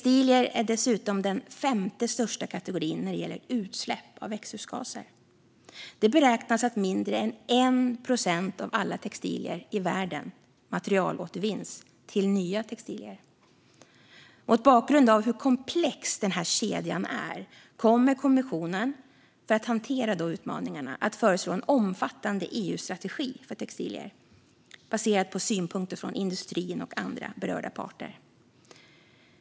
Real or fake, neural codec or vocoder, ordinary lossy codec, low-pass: real; none; none; none